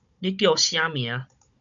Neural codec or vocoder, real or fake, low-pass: codec, 16 kHz, 16 kbps, FunCodec, trained on Chinese and English, 50 frames a second; fake; 7.2 kHz